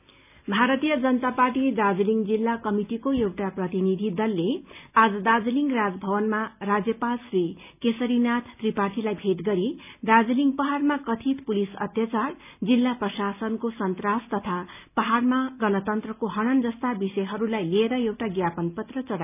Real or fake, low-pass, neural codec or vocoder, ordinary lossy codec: real; 3.6 kHz; none; none